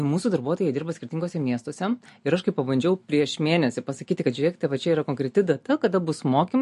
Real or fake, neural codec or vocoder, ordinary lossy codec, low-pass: real; none; MP3, 48 kbps; 14.4 kHz